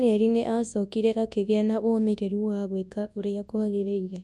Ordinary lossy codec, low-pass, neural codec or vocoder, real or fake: none; none; codec, 24 kHz, 0.9 kbps, WavTokenizer, large speech release; fake